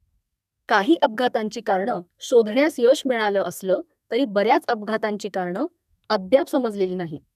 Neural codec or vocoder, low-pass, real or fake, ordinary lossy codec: codec, 32 kHz, 1.9 kbps, SNAC; 14.4 kHz; fake; none